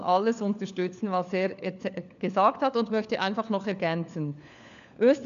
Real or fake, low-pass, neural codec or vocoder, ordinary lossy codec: fake; 7.2 kHz; codec, 16 kHz, 4 kbps, FunCodec, trained on LibriTTS, 50 frames a second; none